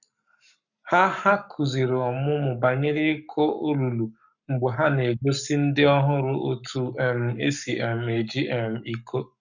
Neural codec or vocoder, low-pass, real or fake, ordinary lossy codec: codec, 44.1 kHz, 7.8 kbps, Pupu-Codec; 7.2 kHz; fake; none